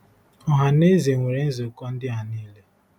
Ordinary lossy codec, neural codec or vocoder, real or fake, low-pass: none; none; real; 19.8 kHz